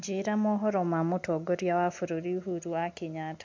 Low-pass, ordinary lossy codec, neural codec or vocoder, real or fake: 7.2 kHz; MP3, 64 kbps; none; real